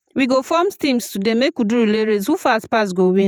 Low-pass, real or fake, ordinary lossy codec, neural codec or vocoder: 19.8 kHz; fake; none; vocoder, 48 kHz, 128 mel bands, Vocos